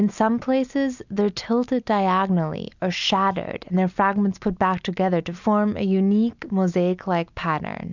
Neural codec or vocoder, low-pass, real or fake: none; 7.2 kHz; real